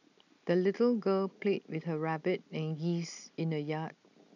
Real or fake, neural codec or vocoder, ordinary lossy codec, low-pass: real; none; none; 7.2 kHz